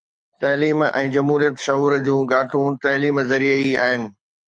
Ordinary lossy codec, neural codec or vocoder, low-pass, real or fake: MP3, 64 kbps; codec, 24 kHz, 6 kbps, HILCodec; 9.9 kHz; fake